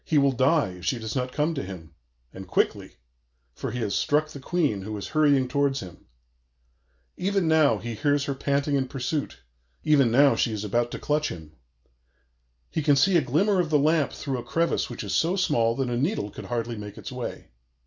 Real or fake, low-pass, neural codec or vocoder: real; 7.2 kHz; none